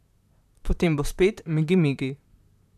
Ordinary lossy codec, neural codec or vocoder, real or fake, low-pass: none; vocoder, 44.1 kHz, 128 mel bands, Pupu-Vocoder; fake; 14.4 kHz